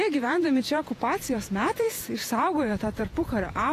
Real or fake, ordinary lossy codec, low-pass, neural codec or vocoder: fake; AAC, 48 kbps; 14.4 kHz; vocoder, 48 kHz, 128 mel bands, Vocos